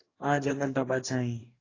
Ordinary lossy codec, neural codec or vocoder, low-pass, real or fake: AAC, 32 kbps; codec, 44.1 kHz, 2.6 kbps, DAC; 7.2 kHz; fake